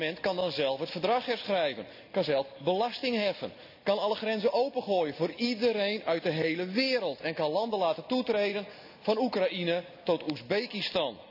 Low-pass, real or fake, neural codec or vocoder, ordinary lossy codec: 5.4 kHz; real; none; none